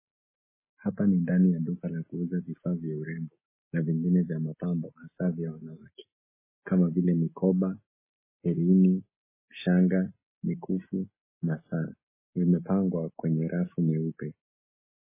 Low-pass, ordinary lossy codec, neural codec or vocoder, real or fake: 3.6 kHz; MP3, 16 kbps; none; real